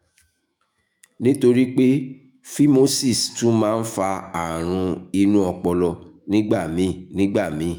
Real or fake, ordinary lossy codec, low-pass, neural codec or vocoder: fake; none; none; autoencoder, 48 kHz, 128 numbers a frame, DAC-VAE, trained on Japanese speech